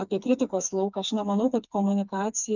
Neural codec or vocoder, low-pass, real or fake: codec, 16 kHz, 4 kbps, FreqCodec, smaller model; 7.2 kHz; fake